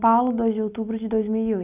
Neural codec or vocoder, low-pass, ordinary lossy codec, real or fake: none; 3.6 kHz; none; real